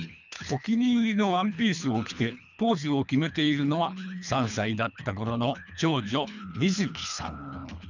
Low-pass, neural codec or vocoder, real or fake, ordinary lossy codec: 7.2 kHz; codec, 24 kHz, 3 kbps, HILCodec; fake; none